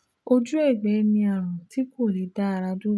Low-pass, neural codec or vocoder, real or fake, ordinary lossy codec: none; none; real; none